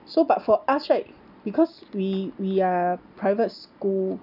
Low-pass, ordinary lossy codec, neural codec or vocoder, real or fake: 5.4 kHz; none; none; real